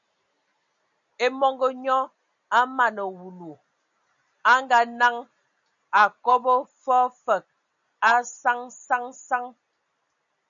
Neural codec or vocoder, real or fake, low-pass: none; real; 7.2 kHz